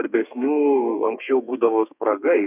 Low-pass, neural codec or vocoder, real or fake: 3.6 kHz; codec, 32 kHz, 1.9 kbps, SNAC; fake